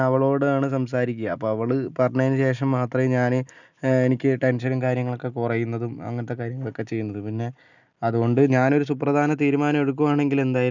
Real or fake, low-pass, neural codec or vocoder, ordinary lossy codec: real; 7.2 kHz; none; none